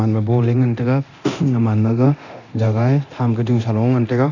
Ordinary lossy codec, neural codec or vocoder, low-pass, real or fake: none; codec, 24 kHz, 0.9 kbps, DualCodec; 7.2 kHz; fake